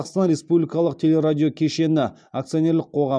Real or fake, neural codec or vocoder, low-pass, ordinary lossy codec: real; none; 9.9 kHz; none